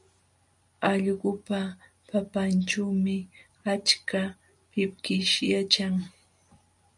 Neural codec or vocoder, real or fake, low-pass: none; real; 10.8 kHz